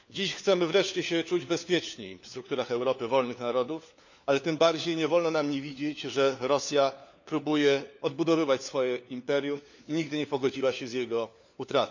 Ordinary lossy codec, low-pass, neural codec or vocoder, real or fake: none; 7.2 kHz; codec, 16 kHz, 4 kbps, FunCodec, trained on LibriTTS, 50 frames a second; fake